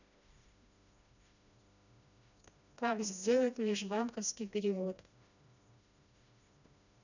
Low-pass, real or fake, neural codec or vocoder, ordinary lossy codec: 7.2 kHz; fake; codec, 16 kHz, 1 kbps, FreqCodec, smaller model; none